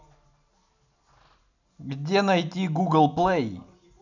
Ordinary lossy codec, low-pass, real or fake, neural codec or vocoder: none; 7.2 kHz; real; none